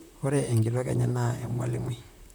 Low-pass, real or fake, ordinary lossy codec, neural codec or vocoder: none; fake; none; vocoder, 44.1 kHz, 128 mel bands, Pupu-Vocoder